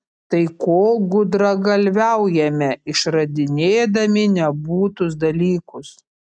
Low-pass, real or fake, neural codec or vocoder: 9.9 kHz; real; none